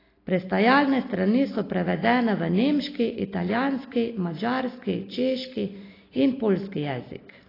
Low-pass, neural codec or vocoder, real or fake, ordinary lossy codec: 5.4 kHz; none; real; AAC, 24 kbps